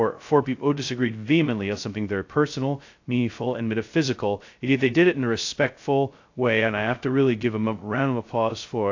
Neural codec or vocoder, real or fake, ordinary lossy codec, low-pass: codec, 16 kHz, 0.2 kbps, FocalCodec; fake; AAC, 48 kbps; 7.2 kHz